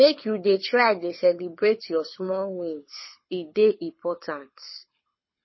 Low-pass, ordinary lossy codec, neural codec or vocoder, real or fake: 7.2 kHz; MP3, 24 kbps; codec, 24 kHz, 6 kbps, HILCodec; fake